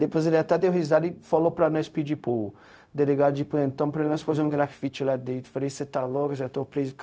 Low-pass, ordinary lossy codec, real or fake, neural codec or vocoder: none; none; fake; codec, 16 kHz, 0.4 kbps, LongCat-Audio-Codec